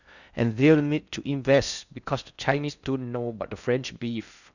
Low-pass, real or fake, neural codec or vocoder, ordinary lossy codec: 7.2 kHz; fake; codec, 16 kHz in and 24 kHz out, 0.6 kbps, FocalCodec, streaming, 4096 codes; none